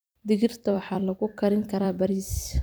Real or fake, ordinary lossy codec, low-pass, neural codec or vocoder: fake; none; none; vocoder, 44.1 kHz, 128 mel bands every 256 samples, BigVGAN v2